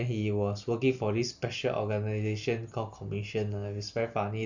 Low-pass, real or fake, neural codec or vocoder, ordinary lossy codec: 7.2 kHz; real; none; none